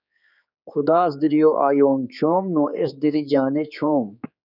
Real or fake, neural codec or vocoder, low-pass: fake; codec, 16 kHz, 4 kbps, X-Codec, HuBERT features, trained on general audio; 5.4 kHz